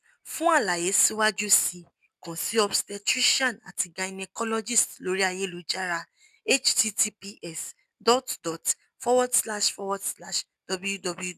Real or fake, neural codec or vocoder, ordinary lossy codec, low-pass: real; none; none; 14.4 kHz